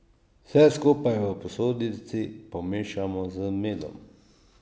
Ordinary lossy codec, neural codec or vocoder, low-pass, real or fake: none; none; none; real